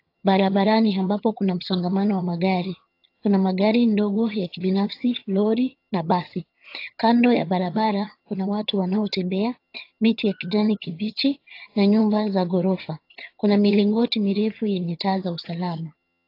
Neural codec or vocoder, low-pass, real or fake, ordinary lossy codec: vocoder, 22.05 kHz, 80 mel bands, HiFi-GAN; 5.4 kHz; fake; AAC, 32 kbps